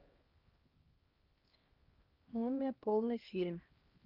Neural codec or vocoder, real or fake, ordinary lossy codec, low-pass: codec, 16 kHz, 1 kbps, X-Codec, HuBERT features, trained on LibriSpeech; fake; Opus, 24 kbps; 5.4 kHz